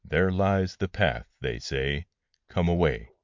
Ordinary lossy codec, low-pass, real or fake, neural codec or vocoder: MP3, 64 kbps; 7.2 kHz; fake; vocoder, 44.1 kHz, 128 mel bands every 512 samples, BigVGAN v2